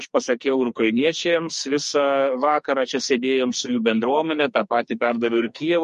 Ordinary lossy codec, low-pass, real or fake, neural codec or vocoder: MP3, 48 kbps; 14.4 kHz; fake; codec, 44.1 kHz, 2.6 kbps, SNAC